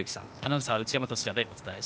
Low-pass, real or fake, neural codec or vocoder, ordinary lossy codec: none; fake; codec, 16 kHz, 0.8 kbps, ZipCodec; none